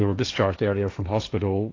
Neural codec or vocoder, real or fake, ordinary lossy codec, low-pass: codec, 16 kHz, 1.1 kbps, Voila-Tokenizer; fake; AAC, 32 kbps; 7.2 kHz